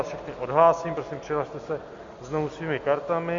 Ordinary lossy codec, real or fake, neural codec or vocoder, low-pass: MP3, 48 kbps; real; none; 7.2 kHz